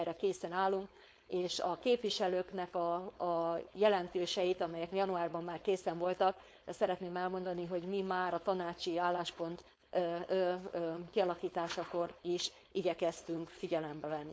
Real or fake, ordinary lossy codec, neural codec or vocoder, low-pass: fake; none; codec, 16 kHz, 4.8 kbps, FACodec; none